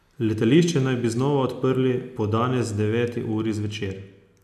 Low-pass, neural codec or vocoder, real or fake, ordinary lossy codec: 14.4 kHz; none; real; none